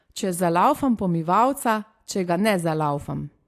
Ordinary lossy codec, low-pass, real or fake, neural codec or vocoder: AAC, 64 kbps; 14.4 kHz; real; none